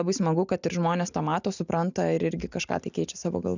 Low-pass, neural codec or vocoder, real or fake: 7.2 kHz; none; real